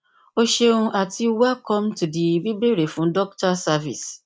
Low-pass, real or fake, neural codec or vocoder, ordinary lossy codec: none; real; none; none